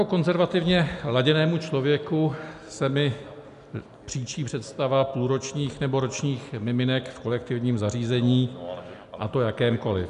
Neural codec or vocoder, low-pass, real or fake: none; 10.8 kHz; real